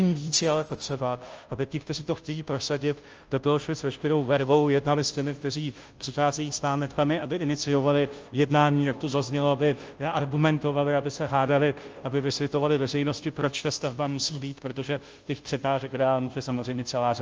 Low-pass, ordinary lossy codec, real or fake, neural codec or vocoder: 7.2 kHz; Opus, 24 kbps; fake; codec, 16 kHz, 0.5 kbps, FunCodec, trained on Chinese and English, 25 frames a second